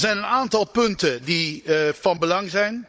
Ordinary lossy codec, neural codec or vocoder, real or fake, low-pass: none; codec, 16 kHz, 16 kbps, FunCodec, trained on Chinese and English, 50 frames a second; fake; none